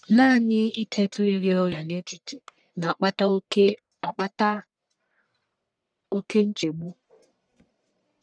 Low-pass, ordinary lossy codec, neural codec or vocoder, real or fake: 9.9 kHz; none; codec, 44.1 kHz, 1.7 kbps, Pupu-Codec; fake